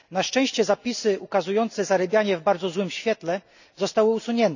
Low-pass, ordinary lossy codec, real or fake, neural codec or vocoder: 7.2 kHz; none; real; none